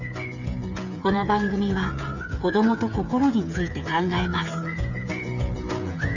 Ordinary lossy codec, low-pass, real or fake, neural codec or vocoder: none; 7.2 kHz; fake; codec, 16 kHz, 8 kbps, FreqCodec, smaller model